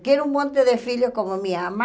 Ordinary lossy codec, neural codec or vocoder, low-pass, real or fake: none; none; none; real